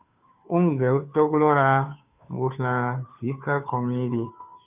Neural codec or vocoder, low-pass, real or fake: codec, 16 kHz, 2 kbps, FunCodec, trained on Chinese and English, 25 frames a second; 3.6 kHz; fake